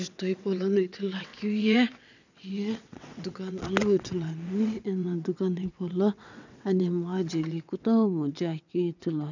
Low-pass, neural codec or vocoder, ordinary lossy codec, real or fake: 7.2 kHz; vocoder, 22.05 kHz, 80 mel bands, WaveNeXt; none; fake